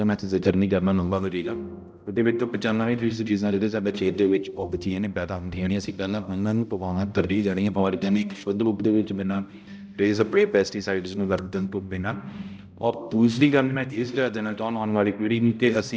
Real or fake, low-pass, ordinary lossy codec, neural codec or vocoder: fake; none; none; codec, 16 kHz, 0.5 kbps, X-Codec, HuBERT features, trained on balanced general audio